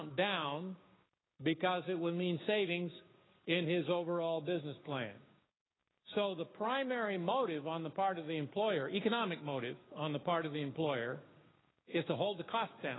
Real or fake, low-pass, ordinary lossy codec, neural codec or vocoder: fake; 7.2 kHz; AAC, 16 kbps; codec, 16 kHz, 6 kbps, DAC